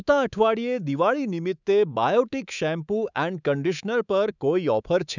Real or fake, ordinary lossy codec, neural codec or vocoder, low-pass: fake; none; codec, 24 kHz, 3.1 kbps, DualCodec; 7.2 kHz